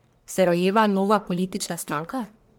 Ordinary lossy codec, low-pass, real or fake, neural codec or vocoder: none; none; fake; codec, 44.1 kHz, 1.7 kbps, Pupu-Codec